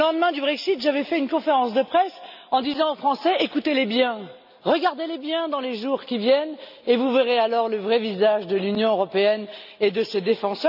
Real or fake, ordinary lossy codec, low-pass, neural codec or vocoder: real; none; 5.4 kHz; none